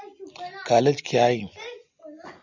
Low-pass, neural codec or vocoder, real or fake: 7.2 kHz; none; real